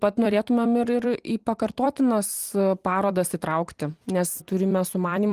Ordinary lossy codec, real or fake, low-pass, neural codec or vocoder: Opus, 24 kbps; fake; 14.4 kHz; vocoder, 44.1 kHz, 128 mel bands every 256 samples, BigVGAN v2